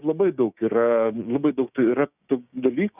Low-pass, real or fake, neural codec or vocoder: 3.6 kHz; fake; codec, 16 kHz, 6 kbps, DAC